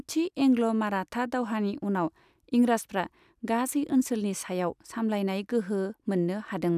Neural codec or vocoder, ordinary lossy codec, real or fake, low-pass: none; none; real; 14.4 kHz